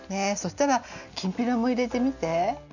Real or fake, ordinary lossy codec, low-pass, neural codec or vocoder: real; none; 7.2 kHz; none